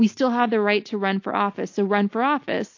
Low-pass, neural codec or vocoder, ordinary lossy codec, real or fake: 7.2 kHz; none; AAC, 48 kbps; real